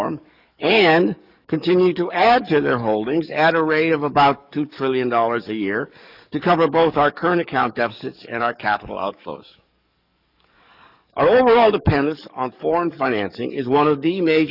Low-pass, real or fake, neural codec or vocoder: 5.4 kHz; fake; codec, 44.1 kHz, 7.8 kbps, DAC